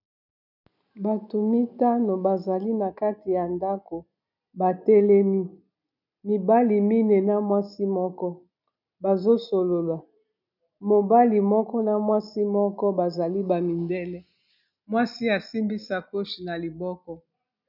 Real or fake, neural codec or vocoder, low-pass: real; none; 5.4 kHz